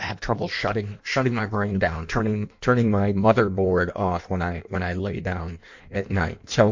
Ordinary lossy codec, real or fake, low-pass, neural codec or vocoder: MP3, 48 kbps; fake; 7.2 kHz; codec, 16 kHz in and 24 kHz out, 1.1 kbps, FireRedTTS-2 codec